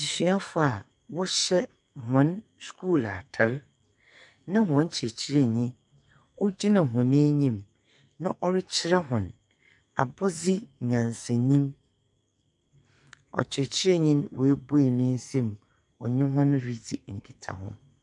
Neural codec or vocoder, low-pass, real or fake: codec, 44.1 kHz, 2.6 kbps, SNAC; 10.8 kHz; fake